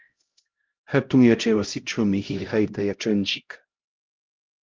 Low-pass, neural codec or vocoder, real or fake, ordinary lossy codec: 7.2 kHz; codec, 16 kHz, 0.5 kbps, X-Codec, HuBERT features, trained on LibriSpeech; fake; Opus, 32 kbps